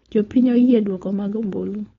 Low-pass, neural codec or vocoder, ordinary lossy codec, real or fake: 7.2 kHz; codec, 16 kHz, 4.8 kbps, FACodec; AAC, 32 kbps; fake